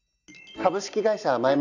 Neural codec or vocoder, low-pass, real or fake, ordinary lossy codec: none; 7.2 kHz; real; none